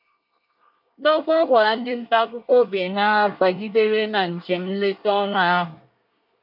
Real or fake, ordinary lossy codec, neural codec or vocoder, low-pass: fake; AAC, 48 kbps; codec, 24 kHz, 1 kbps, SNAC; 5.4 kHz